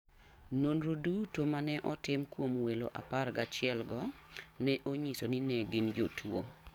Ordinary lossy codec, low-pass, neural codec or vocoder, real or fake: none; 19.8 kHz; codec, 44.1 kHz, 7.8 kbps, DAC; fake